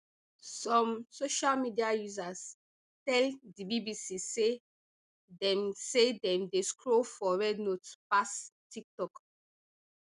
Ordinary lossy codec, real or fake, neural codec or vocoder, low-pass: none; real; none; 10.8 kHz